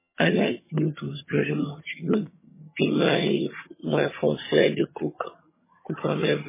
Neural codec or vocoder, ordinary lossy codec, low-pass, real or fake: vocoder, 22.05 kHz, 80 mel bands, HiFi-GAN; MP3, 16 kbps; 3.6 kHz; fake